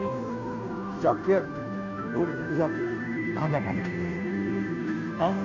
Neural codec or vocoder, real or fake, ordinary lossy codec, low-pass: codec, 16 kHz, 0.5 kbps, FunCodec, trained on Chinese and English, 25 frames a second; fake; MP3, 48 kbps; 7.2 kHz